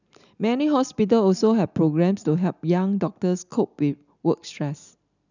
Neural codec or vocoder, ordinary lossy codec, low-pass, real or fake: none; none; 7.2 kHz; real